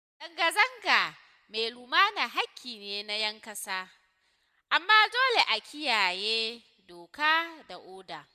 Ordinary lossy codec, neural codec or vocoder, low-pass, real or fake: MP3, 96 kbps; none; 14.4 kHz; real